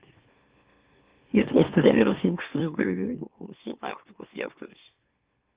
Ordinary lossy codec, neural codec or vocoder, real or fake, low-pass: Opus, 32 kbps; autoencoder, 44.1 kHz, a latent of 192 numbers a frame, MeloTTS; fake; 3.6 kHz